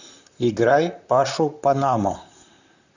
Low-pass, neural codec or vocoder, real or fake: 7.2 kHz; vocoder, 44.1 kHz, 128 mel bands, Pupu-Vocoder; fake